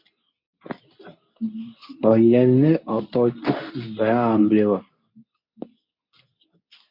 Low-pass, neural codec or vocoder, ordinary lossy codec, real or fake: 5.4 kHz; codec, 24 kHz, 0.9 kbps, WavTokenizer, medium speech release version 2; MP3, 32 kbps; fake